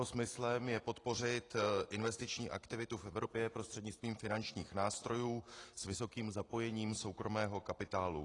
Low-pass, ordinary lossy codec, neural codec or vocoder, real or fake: 10.8 kHz; AAC, 32 kbps; none; real